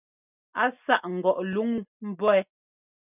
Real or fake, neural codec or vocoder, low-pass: real; none; 3.6 kHz